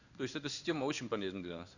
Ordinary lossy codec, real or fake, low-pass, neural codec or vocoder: none; fake; 7.2 kHz; codec, 16 kHz in and 24 kHz out, 1 kbps, XY-Tokenizer